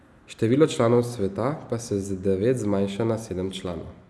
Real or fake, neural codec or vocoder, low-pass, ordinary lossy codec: real; none; none; none